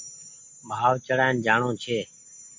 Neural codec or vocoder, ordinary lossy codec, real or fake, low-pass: none; MP3, 48 kbps; real; 7.2 kHz